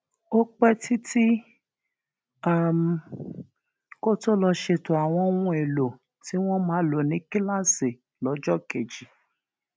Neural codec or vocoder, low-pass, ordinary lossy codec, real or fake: none; none; none; real